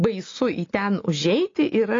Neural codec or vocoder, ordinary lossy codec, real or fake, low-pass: none; AAC, 32 kbps; real; 7.2 kHz